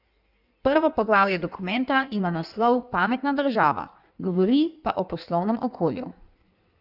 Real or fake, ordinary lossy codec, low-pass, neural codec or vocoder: fake; none; 5.4 kHz; codec, 16 kHz in and 24 kHz out, 1.1 kbps, FireRedTTS-2 codec